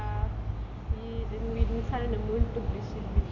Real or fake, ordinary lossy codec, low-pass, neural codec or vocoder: real; none; 7.2 kHz; none